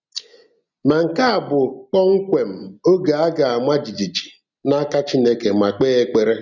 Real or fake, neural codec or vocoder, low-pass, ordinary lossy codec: real; none; 7.2 kHz; none